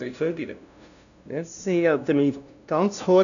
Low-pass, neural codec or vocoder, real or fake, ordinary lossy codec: 7.2 kHz; codec, 16 kHz, 0.5 kbps, FunCodec, trained on LibriTTS, 25 frames a second; fake; none